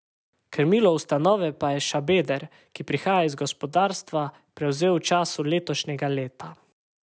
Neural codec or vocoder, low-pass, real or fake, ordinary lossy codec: none; none; real; none